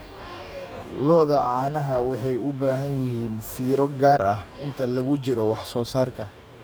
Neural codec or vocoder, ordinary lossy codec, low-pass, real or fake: codec, 44.1 kHz, 2.6 kbps, DAC; none; none; fake